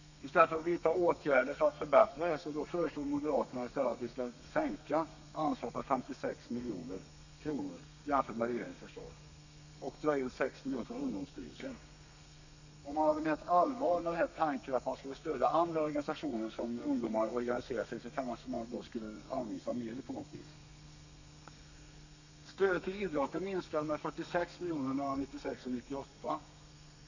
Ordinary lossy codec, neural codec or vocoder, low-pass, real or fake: none; codec, 32 kHz, 1.9 kbps, SNAC; 7.2 kHz; fake